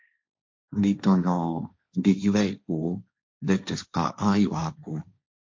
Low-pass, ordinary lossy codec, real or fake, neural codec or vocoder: 7.2 kHz; MP3, 48 kbps; fake; codec, 16 kHz, 1.1 kbps, Voila-Tokenizer